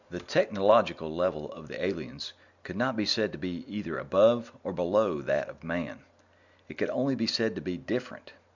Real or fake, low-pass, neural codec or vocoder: real; 7.2 kHz; none